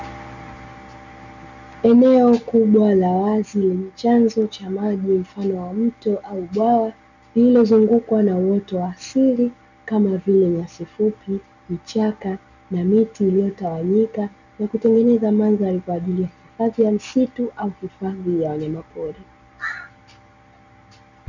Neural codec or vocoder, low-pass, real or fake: none; 7.2 kHz; real